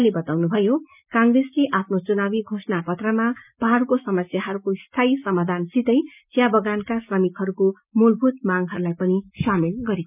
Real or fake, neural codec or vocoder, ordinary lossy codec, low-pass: real; none; none; 3.6 kHz